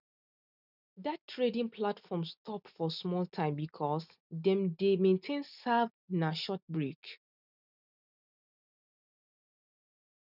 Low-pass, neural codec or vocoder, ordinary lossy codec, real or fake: 5.4 kHz; none; none; real